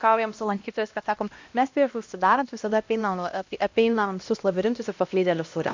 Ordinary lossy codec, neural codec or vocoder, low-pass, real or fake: MP3, 48 kbps; codec, 16 kHz, 1 kbps, X-Codec, HuBERT features, trained on LibriSpeech; 7.2 kHz; fake